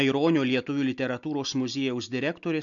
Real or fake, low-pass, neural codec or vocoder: real; 7.2 kHz; none